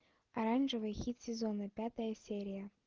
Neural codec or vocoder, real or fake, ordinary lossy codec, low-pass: none; real; Opus, 16 kbps; 7.2 kHz